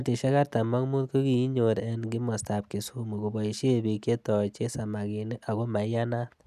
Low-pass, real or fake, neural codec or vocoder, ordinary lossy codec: 14.4 kHz; real; none; none